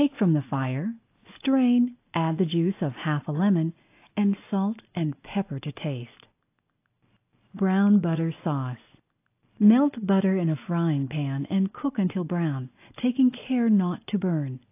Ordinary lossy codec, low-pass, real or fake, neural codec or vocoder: AAC, 24 kbps; 3.6 kHz; real; none